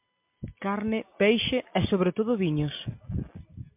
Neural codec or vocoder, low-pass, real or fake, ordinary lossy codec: none; 3.6 kHz; real; MP3, 32 kbps